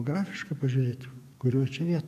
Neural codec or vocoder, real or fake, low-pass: codec, 44.1 kHz, 7.8 kbps, DAC; fake; 14.4 kHz